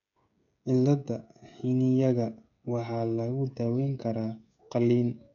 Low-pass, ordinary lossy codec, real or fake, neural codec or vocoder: 7.2 kHz; none; fake; codec, 16 kHz, 16 kbps, FreqCodec, smaller model